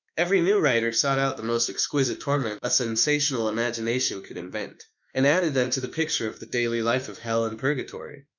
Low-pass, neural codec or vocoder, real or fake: 7.2 kHz; autoencoder, 48 kHz, 32 numbers a frame, DAC-VAE, trained on Japanese speech; fake